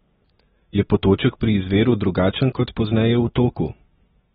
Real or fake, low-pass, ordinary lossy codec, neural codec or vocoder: real; 19.8 kHz; AAC, 16 kbps; none